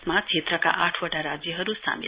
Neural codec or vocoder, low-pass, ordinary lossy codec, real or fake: none; 3.6 kHz; Opus, 64 kbps; real